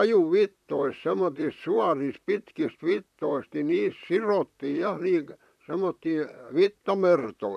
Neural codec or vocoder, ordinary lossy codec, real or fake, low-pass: vocoder, 44.1 kHz, 128 mel bands, Pupu-Vocoder; none; fake; 14.4 kHz